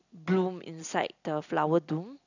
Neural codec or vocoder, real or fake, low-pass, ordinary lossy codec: none; real; 7.2 kHz; none